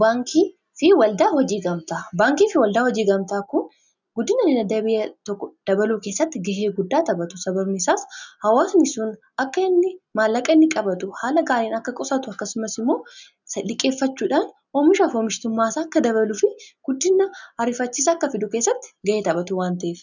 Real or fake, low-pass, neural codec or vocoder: real; 7.2 kHz; none